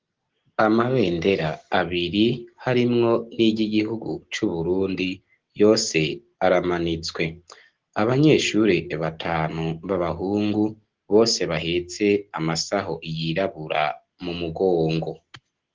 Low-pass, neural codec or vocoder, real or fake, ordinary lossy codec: 7.2 kHz; none; real; Opus, 16 kbps